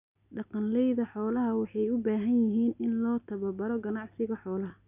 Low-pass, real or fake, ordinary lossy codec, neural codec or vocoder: 3.6 kHz; real; none; none